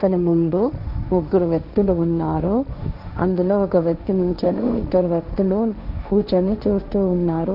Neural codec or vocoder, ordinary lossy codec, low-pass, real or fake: codec, 16 kHz, 1.1 kbps, Voila-Tokenizer; none; 5.4 kHz; fake